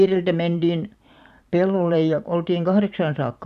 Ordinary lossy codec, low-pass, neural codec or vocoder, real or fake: none; 14.4 kHz; vocoder, 44.1 kHz, 128 mel bands every 512 samples, BigVGAN v2; fake